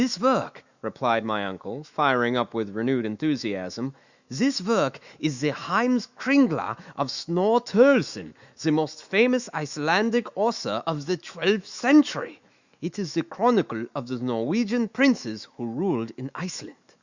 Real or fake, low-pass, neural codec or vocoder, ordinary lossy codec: fake; 7.2 kHz; autoencoder, 48 kHz, 128 numbers a frame, DAC-VAE, trained on Japanese speech; Opus, 64 kbps